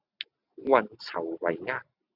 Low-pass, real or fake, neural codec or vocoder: 5.4 kHz; real; none